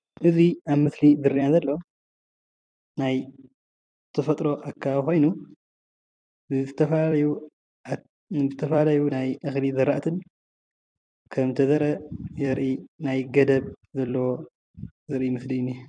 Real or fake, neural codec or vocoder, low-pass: fake; vocoder, 44.1 kHz, 128 mel bands every 256 samples, BigVGAN v2; 9.9 kHz